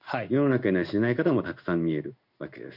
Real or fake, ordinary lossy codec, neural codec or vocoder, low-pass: fake; none; codec, 16 kHz in and 24 kHz out, 1 kbps, XY-Tokenizer; 5.4 kHz